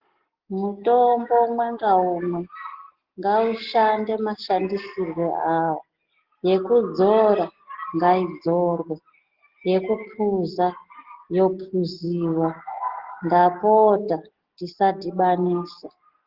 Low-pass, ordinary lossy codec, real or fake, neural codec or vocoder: 5.4 kHz; Opus, 16 kbps; real; none